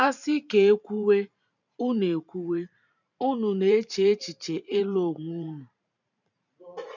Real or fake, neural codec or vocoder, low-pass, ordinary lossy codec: fake; vocoder, 44.1 kHz, 128 mel bands every 512 samples, BigVGAN v2; 7.2 kHz; none